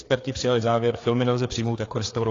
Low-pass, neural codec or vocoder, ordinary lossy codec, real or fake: 7.2 kHz; codec, 16 kHz, 4 kbps, FreqCodec, larger model; AAC, 32 kbps; fake